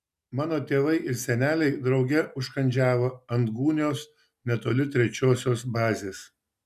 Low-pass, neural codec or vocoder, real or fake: 14.4 kHz; none; real